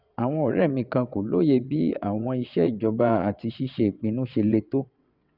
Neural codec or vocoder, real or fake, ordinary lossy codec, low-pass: vocoder, 22.05 kHz, 80 mel bands, WaveNeXt; fake; none; 5.4 kHz